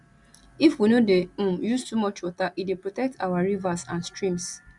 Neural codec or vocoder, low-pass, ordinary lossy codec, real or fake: none; 10.8 kHz; Opus, 64 kbps; real